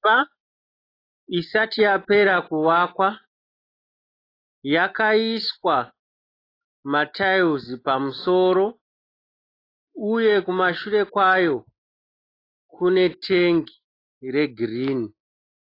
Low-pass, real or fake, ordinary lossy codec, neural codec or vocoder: 5.4 kHz; real; AAC, 24 kbps; none